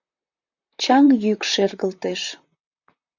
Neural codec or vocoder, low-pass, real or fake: vocoder, 44.1 kHz, 128 mel bands, Pupu-Vocoder; 7.2 kHz; fake